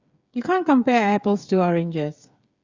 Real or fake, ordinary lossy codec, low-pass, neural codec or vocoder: fake; Opus, 64 kbps; 7.2 kHz; codec, 16 kHz, 8 kbps, FreqCodec, smaller model